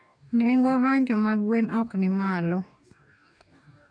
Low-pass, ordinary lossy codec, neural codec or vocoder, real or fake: 9.9 kHz; none; codec, 44.1 kHz, 2.6 kbps, DAC; fake